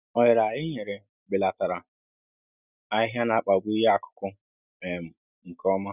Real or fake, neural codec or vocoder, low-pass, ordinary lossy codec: real; none; 3.6 kHz; none